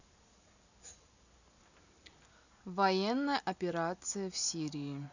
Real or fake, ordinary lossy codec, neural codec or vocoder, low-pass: real; none; none; 7.2 kHz